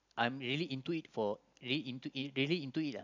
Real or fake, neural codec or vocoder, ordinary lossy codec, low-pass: real; none; none; 7.2 kHz